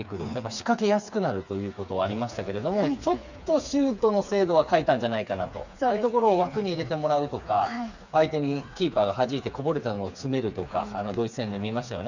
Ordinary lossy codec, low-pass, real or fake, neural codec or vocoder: none; 7.2 kHz; fake; codec, 16 kHz, 4 kbps, FreqCodec, smaller model